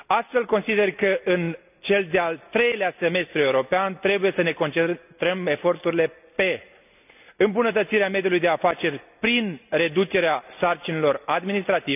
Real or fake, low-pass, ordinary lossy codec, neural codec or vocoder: real; 3.6 kHz; none; none